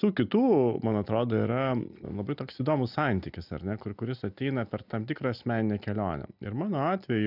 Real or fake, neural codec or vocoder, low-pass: real; none; 5.4 kHz